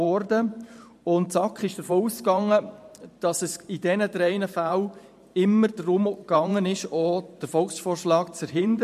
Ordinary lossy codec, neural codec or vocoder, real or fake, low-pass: MP3, 64 kbps; vocoder, 44.1 kHz, 128 mel bands every 512 samples, BigVGAN v2; fake; 14.4 kHz